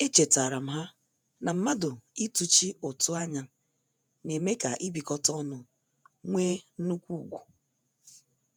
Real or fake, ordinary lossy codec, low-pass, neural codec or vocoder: fake; none; none; vocoder, 48 kHz, 128 mel bands, Vocos